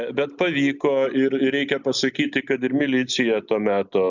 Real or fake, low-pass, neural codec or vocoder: real; 7.2 kHz; none